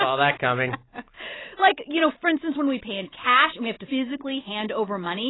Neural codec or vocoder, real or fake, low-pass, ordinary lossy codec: none; real; 7.2 kHz; AAC, 16 kbps